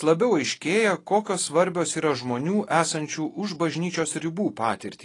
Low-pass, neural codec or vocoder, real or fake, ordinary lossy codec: 10.8 kHz; none; real; AAC, 32 kbps